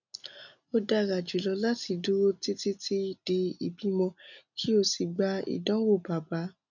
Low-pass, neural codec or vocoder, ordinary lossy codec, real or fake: 7.2 kHz; none; none; real